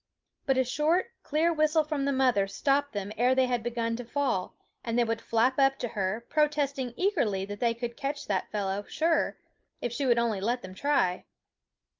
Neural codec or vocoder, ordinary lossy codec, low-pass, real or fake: none; Opus, 24 kbps; 7.2 kHz; real